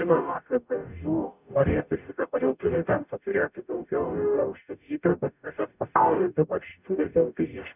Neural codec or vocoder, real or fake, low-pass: codec, 44.1 kHz, 0.9 kbps, DAC; fake; 3.6 kHz